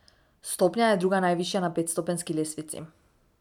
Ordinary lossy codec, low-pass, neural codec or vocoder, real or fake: none; 19.8 kHz; none; real